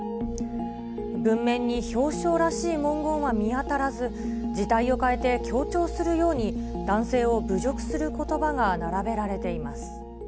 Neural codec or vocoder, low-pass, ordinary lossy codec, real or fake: none; none; none; real